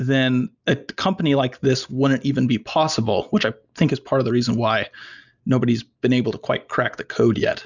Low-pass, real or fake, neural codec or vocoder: 7.2 kHz; real; none